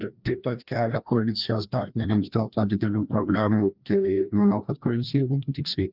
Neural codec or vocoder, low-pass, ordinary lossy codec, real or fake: codec, 16 kHz, 1 kbps, FreqCodec, larger model; 5.4 kHz; Opus, 32 kbps; fake